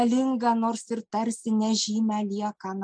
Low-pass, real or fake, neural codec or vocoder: 9.9 kHz; real; none